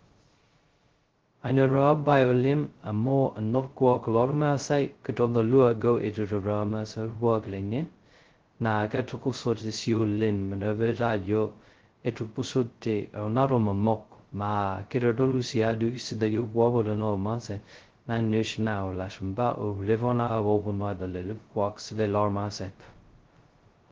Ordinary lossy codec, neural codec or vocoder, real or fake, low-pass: Opus, 16 kbps; codec, 16 kHz, 0.2 kbps, FocalCodec; fake; 7.2 kHz